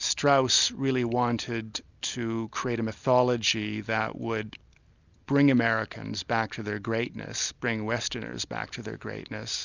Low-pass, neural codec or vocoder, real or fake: 7.2 kHz; none; real